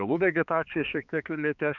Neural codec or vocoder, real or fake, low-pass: codec, 16 kHz, 4 kbps, X-Codec, HuBERT features, trained on balanced general audio; fake; 7.2 kHz